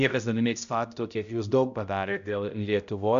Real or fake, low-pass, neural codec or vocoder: fake; 7.2 kHz; codec, 16 kHz, 0.5 kbps, X-Codec, HuBERT features, trained on balanced general audio